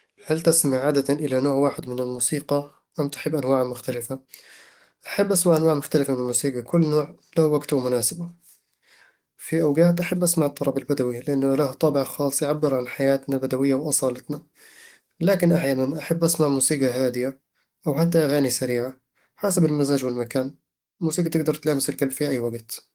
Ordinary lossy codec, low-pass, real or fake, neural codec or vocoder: Opus, 24 kbps; 19.8 kHz; fake; codec, 44.1 kHz, 7.8 kbps, DAC